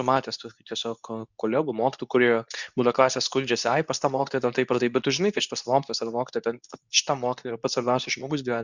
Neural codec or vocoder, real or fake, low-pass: codec, 24 kHz, 0.9 kbps, WavTokenizer, medium speech release version 2; fake; 7.2 kHz